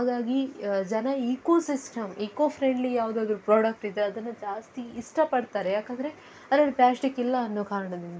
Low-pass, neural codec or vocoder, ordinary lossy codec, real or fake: none; none; none; real